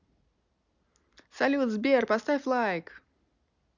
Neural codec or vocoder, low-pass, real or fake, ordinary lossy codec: none; 7.2 kHz; real; none